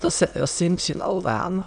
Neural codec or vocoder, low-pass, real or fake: autoencoder, 22.05 kHz, a latent of 192 numbers a frame, VITS, trained on many speakers; 9.9 kHz; fake